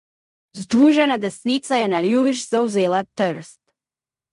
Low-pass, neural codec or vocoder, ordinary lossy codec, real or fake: 10.8 kHz; codec, 16 kHz in and 24 kHz out, 0.4 kbps, LongCat-Audio-Codec, fine tuned four codebook decoder; MP3, 64 kbps; fake